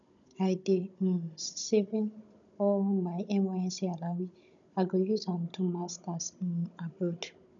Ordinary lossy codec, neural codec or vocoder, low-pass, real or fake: none; codec, 16 kHz, 16 kbps, FunCodec, trained on Chinese and English, 50 frames a second; 7.2 kHz; fake